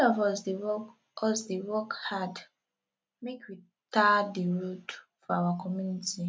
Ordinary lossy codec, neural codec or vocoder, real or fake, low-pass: none; none; real; none